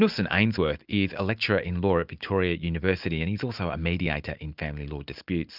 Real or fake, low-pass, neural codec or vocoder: real; 5.4 kHz; none